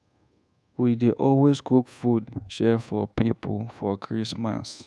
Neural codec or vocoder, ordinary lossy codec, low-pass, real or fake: codec, 24 kHz, 1.2 kbps, DualCodec; none; none; fake